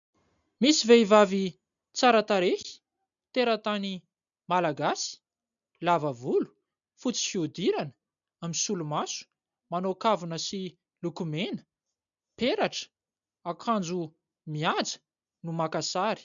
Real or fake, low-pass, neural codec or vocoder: real; 7.2 kHz; none